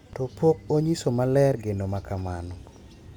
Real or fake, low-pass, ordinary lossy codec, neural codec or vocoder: real; 19.8 kHz; none; none